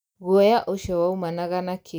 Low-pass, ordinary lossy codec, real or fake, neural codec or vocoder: none; none; real; none